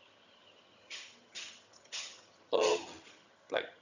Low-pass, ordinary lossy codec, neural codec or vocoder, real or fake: 7.2 kHz; none; vocoder, 22.05 kHz, 80 mel bands, HiFi-GAN; fake